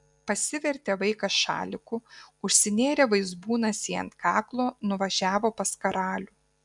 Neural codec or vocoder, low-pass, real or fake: none; 10.8 kHz; real